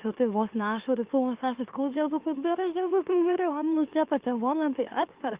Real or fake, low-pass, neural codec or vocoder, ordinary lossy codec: fake; 3.6 kHz; autoencoder, 44.1 kHz, a latent of 192 numbers a frame, MeloTTS; Opus, 32 kbps